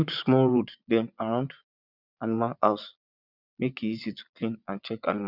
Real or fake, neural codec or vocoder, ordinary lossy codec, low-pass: fake; vocoder, 22.05 kHz, 80 mel bands, Vocos; none; 5.4 kHz